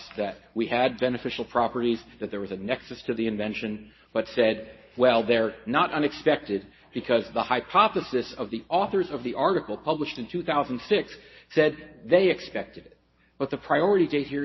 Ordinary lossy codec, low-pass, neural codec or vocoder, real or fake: MP3, 24 kbps; 7.2 kHz; none; real